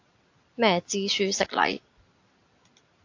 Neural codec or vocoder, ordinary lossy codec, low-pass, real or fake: none; AAC, 48 kbps; 7.2 kHz; real